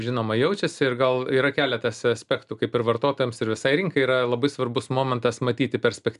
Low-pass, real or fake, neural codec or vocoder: 10.8 kHz; real; none